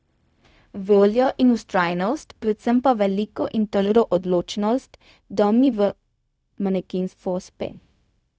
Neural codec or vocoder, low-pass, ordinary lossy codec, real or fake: codec, 16 kHz, 0.4 kbps, LongCat-Audio-Codec; none; none; fake